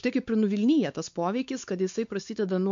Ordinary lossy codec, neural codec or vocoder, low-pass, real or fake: MP3, 64 kbps; codec, 16 kHz, 4 kbps, X-Codec, WavLM features, trained on Multilingual LibriSpeech; 7.2 kHz; fake